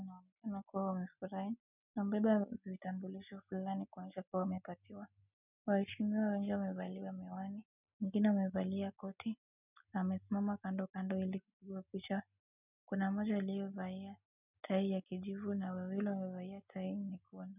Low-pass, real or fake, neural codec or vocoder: 3.6 kHz; real; none